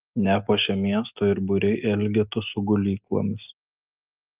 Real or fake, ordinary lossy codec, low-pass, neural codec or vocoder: real; Opus, 24 kbps; 3.6 kHz; none